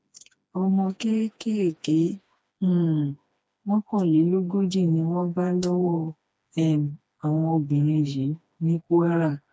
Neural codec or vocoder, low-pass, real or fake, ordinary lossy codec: codec, 16 kHz, 2 kbps, FreqCodec, smaller model; none; fake; none